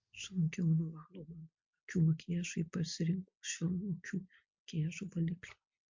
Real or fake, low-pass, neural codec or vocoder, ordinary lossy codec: real; 7.2 kHz; none; MP3, 48 kbps